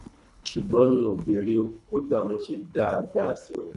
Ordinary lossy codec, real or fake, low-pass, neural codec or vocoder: none; fake; 10.8 kHz; codec, 24 kHz, 1.5 kbps, HILCodec